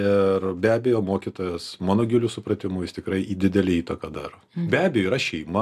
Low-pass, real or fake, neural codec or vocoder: 14.4 kHz; real; none